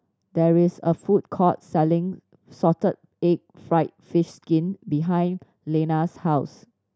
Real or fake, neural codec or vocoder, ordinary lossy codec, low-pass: real; none; none; none